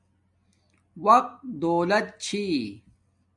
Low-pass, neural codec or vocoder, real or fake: 10.8 kHz; none; real